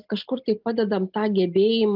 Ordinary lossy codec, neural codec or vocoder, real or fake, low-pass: Opus, 32 kbps; none; real; 5.4 kHz